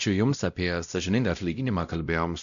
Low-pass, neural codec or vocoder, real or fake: 7.2 kHz; codec, 16 kHz, 0.5 kbps, X-Codec, WavLM features, trained on Multilingual LibriSpeech; fake